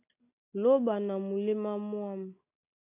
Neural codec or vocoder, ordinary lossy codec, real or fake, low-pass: none; AAC, 32 kbps; real; 3.6 kHz